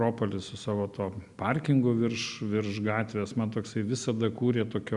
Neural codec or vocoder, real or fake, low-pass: none; real; 10.8 kHz